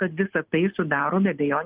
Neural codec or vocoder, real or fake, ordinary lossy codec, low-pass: none; real; Opus, 32 kbps; 3.6 kHz